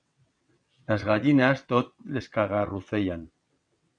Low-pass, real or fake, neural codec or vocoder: 9.9 kHz; fake; vocoder, 22.05 kHz, 80 mel bands, WaveNeXt